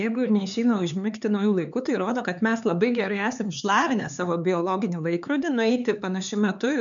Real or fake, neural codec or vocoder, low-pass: fake; codec, 16 kHz, 4 kbps, X-Codec, HuBERT features, trained on LibriSpeech; 7.2 kHz